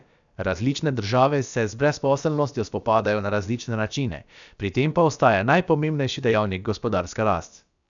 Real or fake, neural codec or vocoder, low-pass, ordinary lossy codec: fake; codec, 16 kHz, about 1 kbps, DyCAST, with the encoder's durations; 7.2 kHz; none